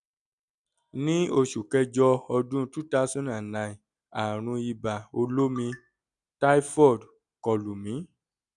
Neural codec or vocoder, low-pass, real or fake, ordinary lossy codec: none; 10.8 kHz; real; none